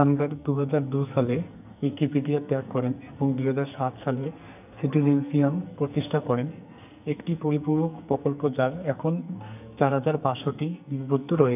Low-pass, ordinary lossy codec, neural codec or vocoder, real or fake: 3.6 kHz; none; codec, 44.1 kHz, 2.6 kbps, SNAC; fake